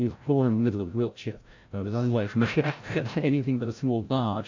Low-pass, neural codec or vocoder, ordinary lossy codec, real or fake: 7.2 kHz; codec, 16 kHz, 0.5 kbps, FreqCodec, larger model; AAC, 48 kbps; fake